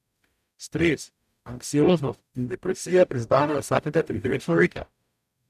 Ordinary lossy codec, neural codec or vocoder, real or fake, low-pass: none; codec, 44.1 kHz, 0.9 kbps, DAC; fake; 14.4 kHz